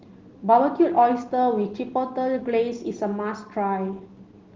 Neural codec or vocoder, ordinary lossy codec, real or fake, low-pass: none; Opus, 16 kbps; real; 7.2 kHz